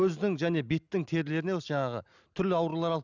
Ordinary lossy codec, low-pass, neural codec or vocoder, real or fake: none; 7.2 kHz; none; real